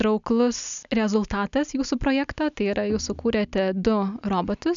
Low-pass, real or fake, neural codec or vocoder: 7.2 kHz; real; none